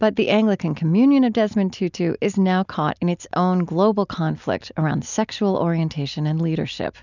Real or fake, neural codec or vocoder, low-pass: real; none; 7.2 kHz